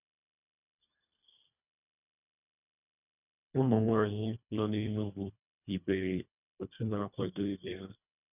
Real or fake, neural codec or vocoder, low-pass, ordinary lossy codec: fake; codec, 24 kHz, 1.5 kbps, HILCodec; 3.6 kHz; none